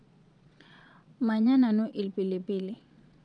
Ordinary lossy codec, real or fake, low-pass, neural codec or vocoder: none; fake; 9.9 kHz; vocoder, 22.05 kHz, 80 mel bands, Vocos